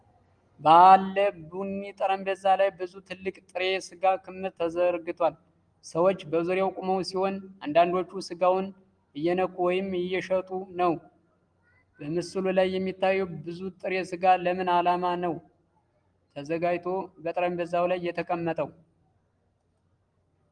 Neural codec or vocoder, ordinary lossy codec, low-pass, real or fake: none; Opus, 24 kbps; 9.9 kHz; real